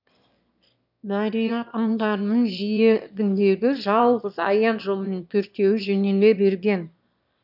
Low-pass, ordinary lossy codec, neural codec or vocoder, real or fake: 5.4 kHz; none; autoencoder, 22.05 kHz, a latent of 192 numbers a frame, VITS, trained on one speaker; fake